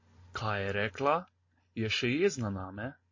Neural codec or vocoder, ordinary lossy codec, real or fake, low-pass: none; MP3, 32 kbps; real; 7.2 kHz